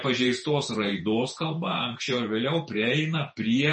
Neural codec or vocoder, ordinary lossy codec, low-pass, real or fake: vocoder, 48 kHz, 128 mel bands, Vocos; MP3, 32 kbps; 10.8 kHz; fake